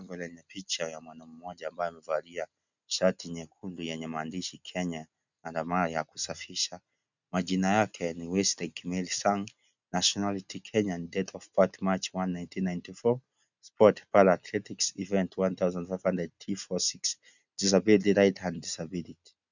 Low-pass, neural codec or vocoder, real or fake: 7.2 kHz; none; real